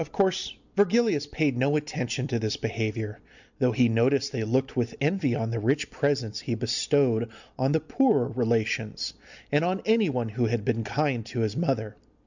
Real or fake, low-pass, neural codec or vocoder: real; 7.2 kHz; none